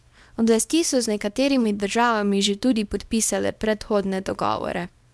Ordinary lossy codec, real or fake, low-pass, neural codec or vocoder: none; fake; none; codec, 24 kHz, 0.9 kbps, WavTokenizer, small release